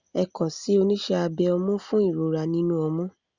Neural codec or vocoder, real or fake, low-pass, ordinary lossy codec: none; real; 7.2 kHz; Opus, 64 kbps